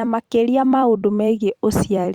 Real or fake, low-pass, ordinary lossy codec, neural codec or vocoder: fake; 19.8 kHz; Opus, 64 kbps; vocoder, 44.1 kHz, 128 mel bands every 256 samples, BigVGAN v2